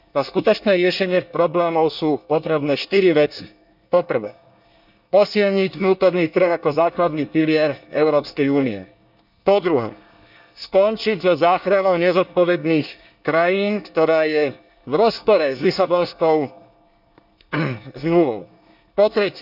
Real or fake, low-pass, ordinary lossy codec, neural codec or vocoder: fake; 5.4 kHz; none; codec, 24 kHz, 1 kbps, SNAC